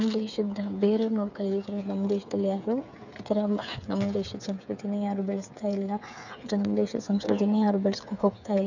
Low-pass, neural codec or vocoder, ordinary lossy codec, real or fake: 7.2 kHz; codec, 16 kHz, 8 kbps, FreqCodec, smaller model; none; fake